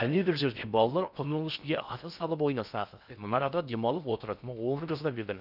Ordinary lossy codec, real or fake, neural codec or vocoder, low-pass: none; fake; codec, 16 kHz in and 24 kHz out, 0.6 kbps, FocalCodec, streaming, 4096 codes; 5.4 kHz